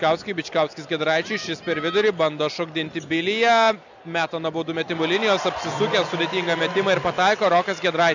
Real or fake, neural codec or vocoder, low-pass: real; none; 7.2 kHz